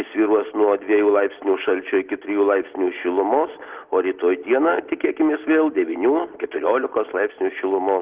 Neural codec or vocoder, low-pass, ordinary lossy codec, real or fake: none; 3.6 kHz; Opus, 16 kbps; real